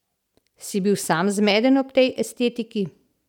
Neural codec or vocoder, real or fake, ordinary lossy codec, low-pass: none; real; none; 19.8 kHz